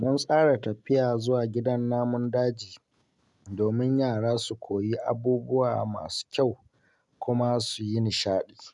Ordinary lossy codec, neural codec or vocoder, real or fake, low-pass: none; none; real; 10.8 kHz